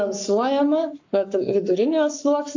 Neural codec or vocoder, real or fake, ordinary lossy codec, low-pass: codec, 44.1 kHz, 7.8 kbps, Pupu-Codec; fake; AAC, 48 kbps; 7.2 kHz